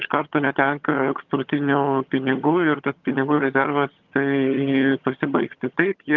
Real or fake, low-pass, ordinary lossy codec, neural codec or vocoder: fake; 7.2 kHz; Opus, 24 kbps; vocoder, 22.05 kHz, 80 mel bands, HiFi-GAN